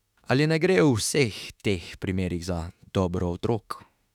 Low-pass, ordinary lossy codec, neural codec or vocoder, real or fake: 19.8 kHz; none; autoencoder, 48 kHz, 32 numbers a frame, DAC-VAE, trained on Japanese speech; fake